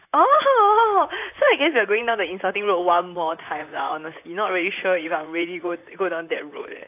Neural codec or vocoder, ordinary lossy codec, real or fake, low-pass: vocoder, 44.1 kHz, 128 mel bands, Pupu-Vocoder; AAC, 32 kbps; fake; 3.6 kHz